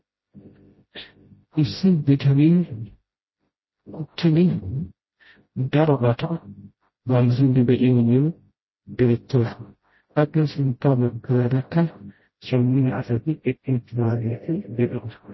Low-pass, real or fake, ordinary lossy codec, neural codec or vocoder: 7.2 kHz; fake; MP3, 24 kbps; codec, 16 kHz, 0.5 kbps, FreqCodec, smaller model